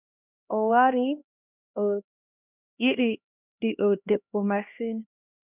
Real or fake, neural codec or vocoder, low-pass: fake; codec, 16 kHz, 1 kbps, X-Codec, HuBERT features, trained on LibriSpeech; 3.6 kHz